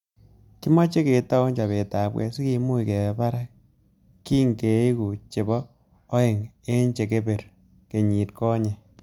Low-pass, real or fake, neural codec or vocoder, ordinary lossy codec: 19.8 kHz; real; none; MP3, 96 kbps